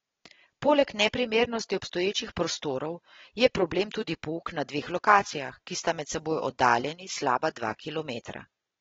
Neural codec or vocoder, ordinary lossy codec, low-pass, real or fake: none; AAC, 24 kbps; 7.2 kHz; real